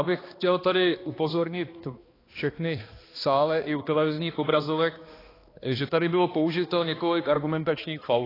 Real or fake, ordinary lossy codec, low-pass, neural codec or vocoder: fake; AAC, 24 kbps; 5.4 kHz; codec, 16 kHz, 2 kbps, X-Codec, HuBERT features, trained on balanced general audio